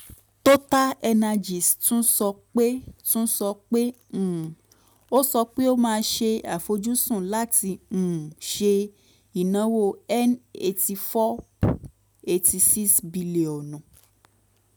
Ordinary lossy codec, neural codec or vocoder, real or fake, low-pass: none; none; real; none